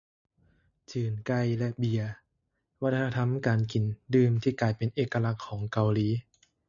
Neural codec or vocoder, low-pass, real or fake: none; 7.2 kHz; real